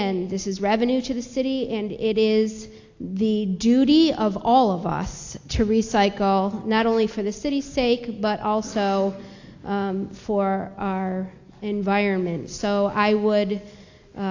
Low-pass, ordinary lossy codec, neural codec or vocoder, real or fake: 7.2 kHz; AAC, 48 kbps; none; real